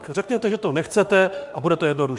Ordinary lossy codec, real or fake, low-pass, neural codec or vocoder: Opus, 64 kbps; fake; 10.8 kHz; autoencoder, 48 kHz, 32 numbers a frame, DAC-VAE, trained on Japanese speech